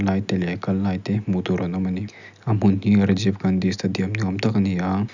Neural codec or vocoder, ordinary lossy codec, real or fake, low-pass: none; none; real; 7.2 kHz